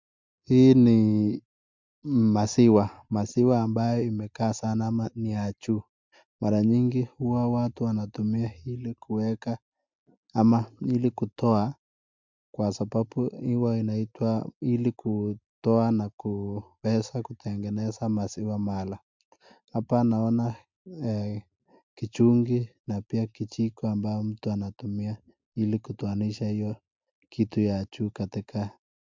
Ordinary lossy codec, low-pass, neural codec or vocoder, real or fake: MP3, 64 kbps; 7.2 kHz; none; real